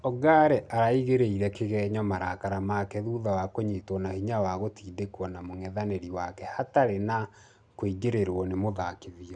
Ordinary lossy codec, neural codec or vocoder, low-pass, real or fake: none; none; 9.9 kHz; real